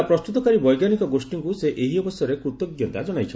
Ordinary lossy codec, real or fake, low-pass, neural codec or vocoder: none; real; none; none